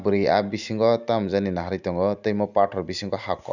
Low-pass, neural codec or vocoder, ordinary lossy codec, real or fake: 7.2 kHz; none; none; real